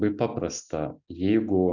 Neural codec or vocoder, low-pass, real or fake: none; 7.2 kHz; real